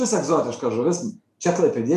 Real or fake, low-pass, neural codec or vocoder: real; 14.4 kHz; none